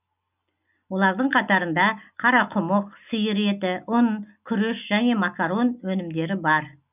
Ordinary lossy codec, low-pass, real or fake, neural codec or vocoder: none; 3.6 kHz; real; none